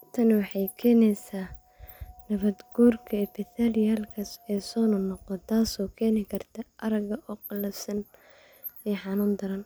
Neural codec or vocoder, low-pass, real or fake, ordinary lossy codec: none; none; real; none